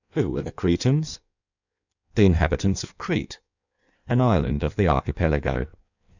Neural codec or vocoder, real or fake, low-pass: codec, 16 kHz in and 24 kHz out, 1.1 kbps, FireRedTTS-2 codec; fake; 7.2 kHz